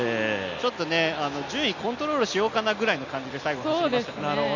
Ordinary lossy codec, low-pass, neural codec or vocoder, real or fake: none; 7.2 kHz; none; real